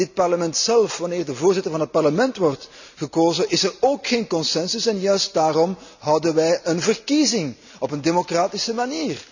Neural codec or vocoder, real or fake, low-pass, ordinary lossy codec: none; real; 7.2 kHz; none